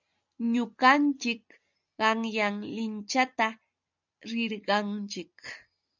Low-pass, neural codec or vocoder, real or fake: 7.2 kHz; none; real